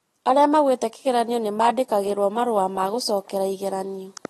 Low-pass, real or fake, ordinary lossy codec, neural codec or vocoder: 19.8 kHz; real; AAC, 32 kbps; none